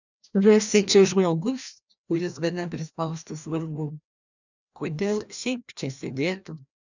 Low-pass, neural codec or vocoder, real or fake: 7.2 kHz; codec, 16 kHz, 1 kbps, FreqCodec, larger model; fake